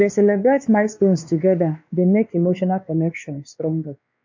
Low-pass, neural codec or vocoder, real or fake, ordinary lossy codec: 7.2 kHz; codec, 16 kHz, 2 kbps, X-Codec, WavLM features, trained on Multilingual LibriSpeech; fake; MP3, 48 kbps